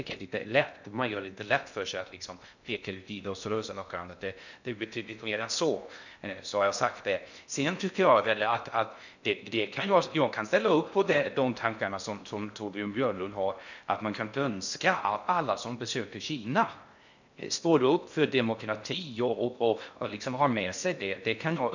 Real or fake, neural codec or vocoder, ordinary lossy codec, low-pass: fake; codec, 16 kHz in and 24 kHz out, 0.6 kbps, FocalCodec, streaming, 2048 codes; none; 7.2 kHz